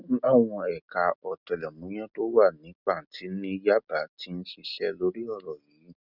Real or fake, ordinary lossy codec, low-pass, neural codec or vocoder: real; none; 5.4 kHz; none